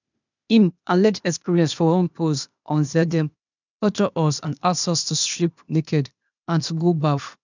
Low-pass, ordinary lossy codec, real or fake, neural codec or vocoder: 7.2 kHz; none; fake; codec, 16 kHz, 0.8 kbps, ZipCodec